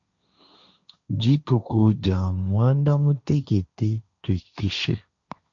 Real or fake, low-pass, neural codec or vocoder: fake; 7.2 kHz; codec, 16 kHz, 1.1 kbps, Voila-Tokenizer